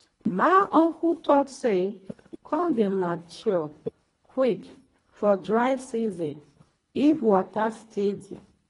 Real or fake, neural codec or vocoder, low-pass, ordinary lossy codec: fake; codec, 24 kHz, 1.5 kbps, HILCodec; 10.8 kHz; AAC, 32 kbps